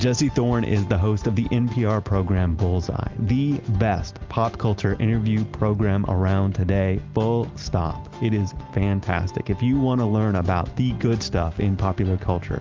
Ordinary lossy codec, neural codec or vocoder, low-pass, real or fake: Opus, 32 kbps; none; 7.2 kHz; real